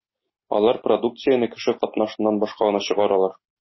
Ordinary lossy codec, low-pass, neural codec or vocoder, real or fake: MP3, 24 kbps; 7.2 kHz; none; real